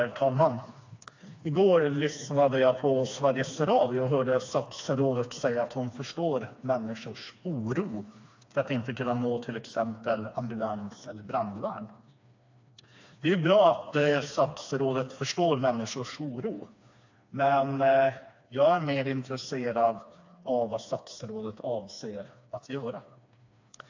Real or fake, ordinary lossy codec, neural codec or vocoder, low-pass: fake; AAC, 48 kbps; codec, 16 kHz, 2 kbps, FreqCodec, smaller model; 7.2 kHz